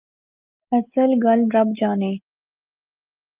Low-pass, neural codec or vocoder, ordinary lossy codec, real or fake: 3.6 kHz; none; Opus, 32 kbps; real